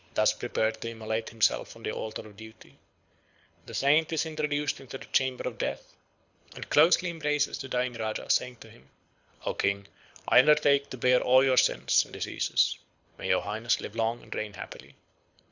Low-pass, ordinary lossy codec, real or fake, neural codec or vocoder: 7.2 kHz; Opus, 64 kbps; fake; codec, 24 kHz, 6 kbps, HILCodec